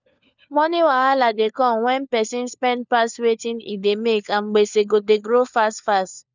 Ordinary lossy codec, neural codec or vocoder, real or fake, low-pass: none; codec, 16 kHz, 4 kbps, FunCodec, trained on LibriTTS, 50 frames a second; fake; 7.2 kHz